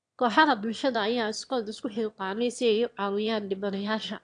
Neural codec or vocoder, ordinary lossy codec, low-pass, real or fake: autoencoder, 22.05 kHz, a latent of 192 numbers a frame, VITS, trained on one speaker; AAC, 64 kbps; 9.9 kHz; fake